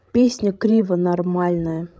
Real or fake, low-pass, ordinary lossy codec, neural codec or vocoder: fake; none; none; codec, 16 kHz, 16 kbps, FreqCodec, larger model